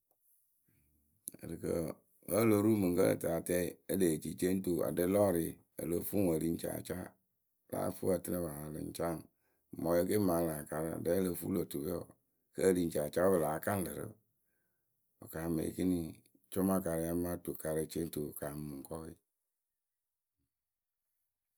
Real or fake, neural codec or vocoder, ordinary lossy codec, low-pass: real; none; none; none